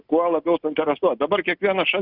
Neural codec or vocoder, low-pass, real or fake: none; 5.4 kHz; real